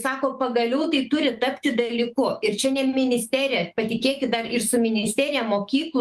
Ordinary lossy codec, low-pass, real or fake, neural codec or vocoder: Opus, 32 kbps; 14.4 kHz; fake; autoencoder, 48 kHz, 128 numbers a frame, DAC-VAE, trained on Japanese speech